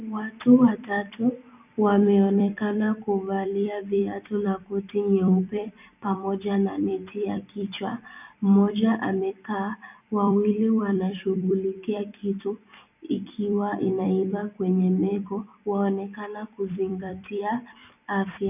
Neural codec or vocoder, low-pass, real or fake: vocoder, 44.1 kHz, 128 mel bands every 256 samples, BigVGAN v2; 3.6 kHz; fake